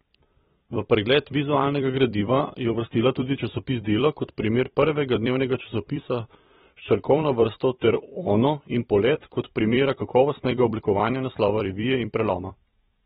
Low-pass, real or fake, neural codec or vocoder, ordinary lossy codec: 19.8 kHz; fake; vocoder, 44.1 kHz, 128 mel bands every 256 samples, BigVGAN v2; AAC, 16 kbps